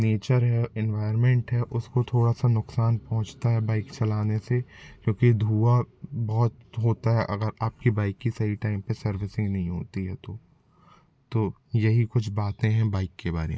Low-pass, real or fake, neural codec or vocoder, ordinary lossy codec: none; real; none; none